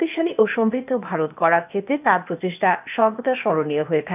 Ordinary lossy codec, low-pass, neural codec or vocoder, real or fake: none; 3.6 kHz; codec, 16 kHz, 0.8 kbps, ZipCodec; fake